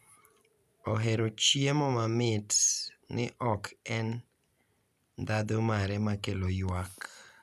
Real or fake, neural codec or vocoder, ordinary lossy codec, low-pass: real; none; none; 14.4 kHz